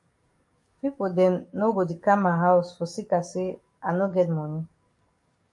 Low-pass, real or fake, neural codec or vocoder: 10.8 kHz; fake; codec, 44.1 kHz, 7.8 kbps, DAC